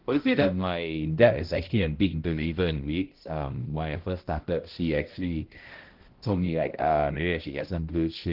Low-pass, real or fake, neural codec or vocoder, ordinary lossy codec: 5.4 kHz; fake; codec, 16 kHz, 0.5 kbps, X-Codec, HuBERT features, trained on balanced general audio; Opus, 16 kbps